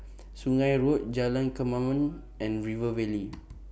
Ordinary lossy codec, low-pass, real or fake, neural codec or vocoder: none; none; real; none